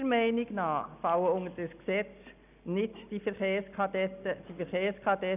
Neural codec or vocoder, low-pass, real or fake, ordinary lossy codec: none; 3.6 kHz; real; none